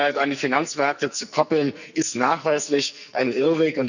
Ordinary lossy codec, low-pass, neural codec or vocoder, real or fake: none; 7.2 kHz; codec, 44.1 kHz, 2.6 kbps, SNAC; fake